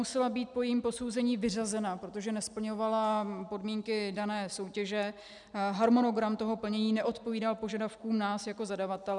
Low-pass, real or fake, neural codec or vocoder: 10.8 kHz; real; none